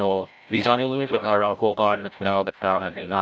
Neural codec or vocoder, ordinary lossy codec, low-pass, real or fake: codec, 16 kHz, 0.5 kbps, FreqCodec, larger model; none; none; fake